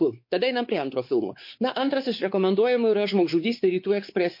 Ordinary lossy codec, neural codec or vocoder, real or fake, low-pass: MP3, 32 kbps; codec, 16 kHz, 4 kbps, X-Codec, WavLM features, trained on Multilingual LibriSpeech; fake; 5.4 kHz